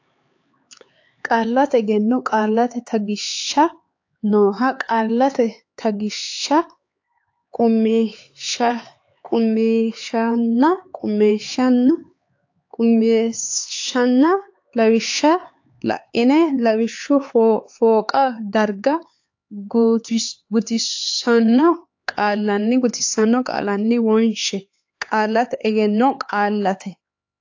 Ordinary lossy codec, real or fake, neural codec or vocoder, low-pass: AAC, 48 kbps; fake; codec, 16 kHz, 4 kbps, X-Codec, HuBERT features, trained on LibriSpeech; 7.2 kHz